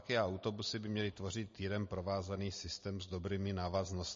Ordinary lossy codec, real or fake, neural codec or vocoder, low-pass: MP3, 32 kbps; real; none; 7.2 kHz